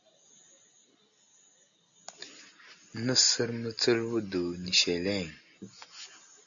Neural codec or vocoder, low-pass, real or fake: none; 7.2 kHz; real